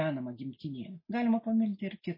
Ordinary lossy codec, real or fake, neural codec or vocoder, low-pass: MP3, 24 kbps; real; none; 5.4 kHz